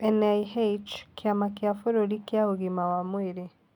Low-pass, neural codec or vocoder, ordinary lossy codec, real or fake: 19.8 kHz; none; none; real